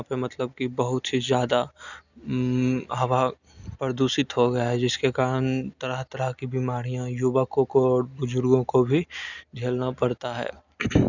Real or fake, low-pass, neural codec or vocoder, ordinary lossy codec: real; 7.2 kHz; none; none